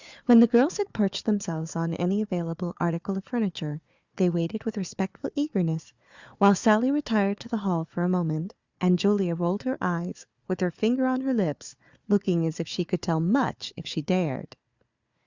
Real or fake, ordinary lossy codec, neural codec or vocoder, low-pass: fake; Opus, 64 kbps; codec, 16 kHz, 4 kbps, FunCodec, trained on Chinese and English, 50 frames a second; 7.2 kHz